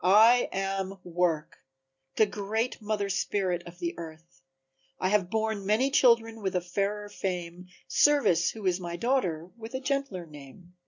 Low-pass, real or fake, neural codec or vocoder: 7.2 kHz; real; none